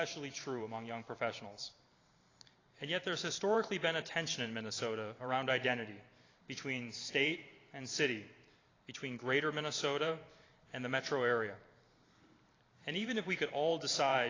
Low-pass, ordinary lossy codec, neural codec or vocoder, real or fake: 7.2 kHz; AAC, 32 kbps; vocoder, 44.1 kHz, 128 mel bands every 512 samples, BigVGAN v2; fake